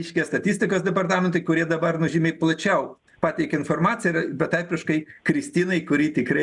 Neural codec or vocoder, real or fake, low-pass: none; real; 10.8 kHz